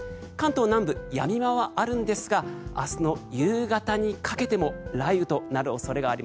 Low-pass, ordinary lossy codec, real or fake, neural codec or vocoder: none; none; real; none